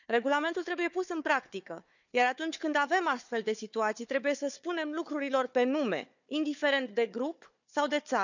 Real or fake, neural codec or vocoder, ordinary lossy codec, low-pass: fake; codec, 16 kHz, 4 kbps, FunCodec, trained on Chinese and English, 50 frames a second; none; 7.2 kHz